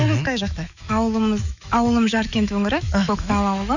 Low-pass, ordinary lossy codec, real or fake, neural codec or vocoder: 7.2 kHz; none; fake; autoencoder, 48 kHz, 128 numbers a frame, DAC-VAE, trained on Japanese speech